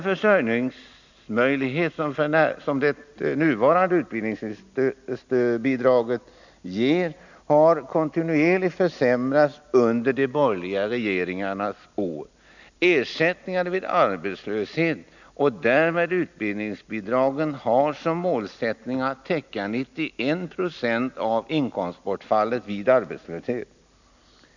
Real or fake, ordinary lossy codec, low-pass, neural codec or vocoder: real; none; 7.2 kHz; none